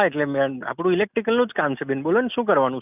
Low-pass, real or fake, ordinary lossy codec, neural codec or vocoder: 3.6 kHz; real; none; none